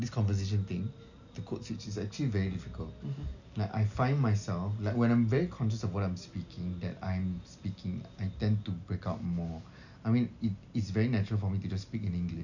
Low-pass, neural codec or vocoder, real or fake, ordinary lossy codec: 7.2 kHz; none; real; none